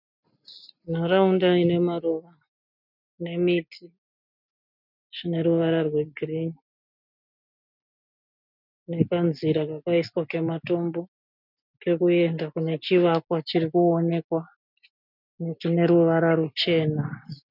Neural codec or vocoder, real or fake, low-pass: none; real; 5.4 kHz